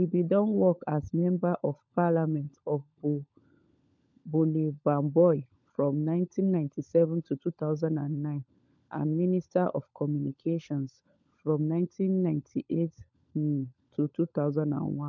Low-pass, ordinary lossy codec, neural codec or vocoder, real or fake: 7.2 kHz; none; codec, 16 kHz, 16 kbps, FunCodec, trained on LibriTTS, 50 frames a second; fake